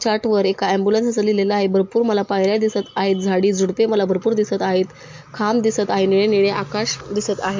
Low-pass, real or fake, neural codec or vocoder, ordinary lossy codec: 7.2 kHz; real; none; MP3, 48 kbps